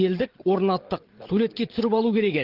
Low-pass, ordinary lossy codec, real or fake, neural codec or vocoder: 5.4 kHz; Opus, 16 kbps; fake; codec, 16 kHz, 16 kbps, FreqCodec, larger model